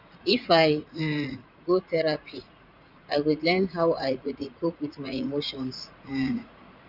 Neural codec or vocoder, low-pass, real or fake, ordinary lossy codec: vocoder, 44.1 kHz, 80 mel bands, Vocos; 5.4 kHz; fake; none